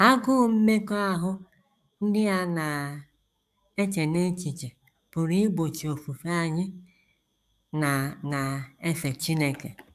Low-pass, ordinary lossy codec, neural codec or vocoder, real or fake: 14.4 kHz; none; codec, 44.1 kHz, 7.8 kbps, DAC; fake